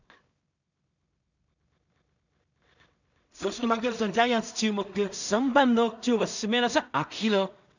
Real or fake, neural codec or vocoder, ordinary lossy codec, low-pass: fake; codec, 16 kHz in and 24 kHz out, 0.4 kbps, LongCat-Audio-Codec, two codebook decoder; none; 7.2 kHz